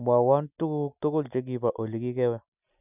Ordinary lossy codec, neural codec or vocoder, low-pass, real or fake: none; none; 3.6 kHz; real